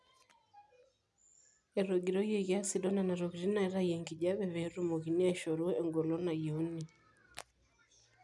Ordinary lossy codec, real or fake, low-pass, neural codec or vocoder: none; real; none; none